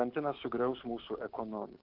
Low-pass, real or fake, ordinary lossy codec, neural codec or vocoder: 5.4 kHz; fake; Opus, 16 kbps; codec, 16 kHz, 6 kbps, DAC